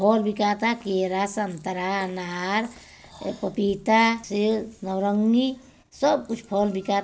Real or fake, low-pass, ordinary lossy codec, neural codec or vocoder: real; none; none; none